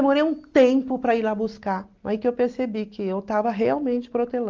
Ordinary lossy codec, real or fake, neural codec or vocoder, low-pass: Opus, 32 kbps; real; none; 7.2 kHz